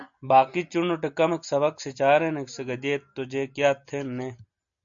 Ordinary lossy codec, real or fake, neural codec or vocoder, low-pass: AAC, 64 kbps; real; none; 7.2 kHz